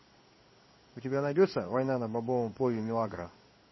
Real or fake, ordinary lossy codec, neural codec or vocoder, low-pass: fake; MP3, 24 kbps; codec, 16 kHz in and 24 kHz out, 1 kbps, XY-Tokenizer; 7.2 kHz